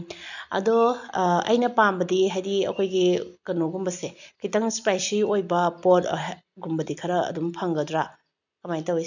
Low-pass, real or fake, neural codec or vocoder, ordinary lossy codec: 7.2 kHz; real; none; none